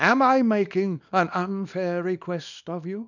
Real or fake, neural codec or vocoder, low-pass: fake; codec, 24 kHz, 0.9 kbps, WavTokenizer, small release; 7.2 kHz